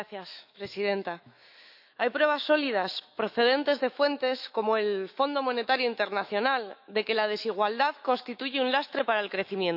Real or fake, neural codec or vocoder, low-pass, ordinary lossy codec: fake; autoencoder, 48 kHz, 128 numbers a frame, DAC-VAE, trained on Japanese speech; 5.4 kHz; none